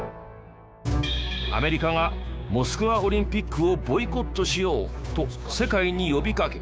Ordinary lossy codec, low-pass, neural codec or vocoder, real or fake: none; none; codec, 16 kHz, 6 kbps, DAC; fake